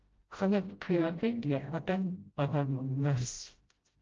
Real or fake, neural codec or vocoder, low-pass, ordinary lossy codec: fake; codec, 16 kHz, 0.5 kbps, FreqCodec, smaller model; 7.2 kHz; Opus, 24 kbps